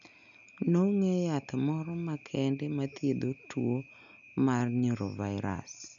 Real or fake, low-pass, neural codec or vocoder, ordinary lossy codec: real; 7.2 kHz; none; none